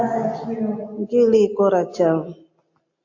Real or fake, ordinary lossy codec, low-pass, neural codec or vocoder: real; AAC, 48 kbps; 7.2 kHz; none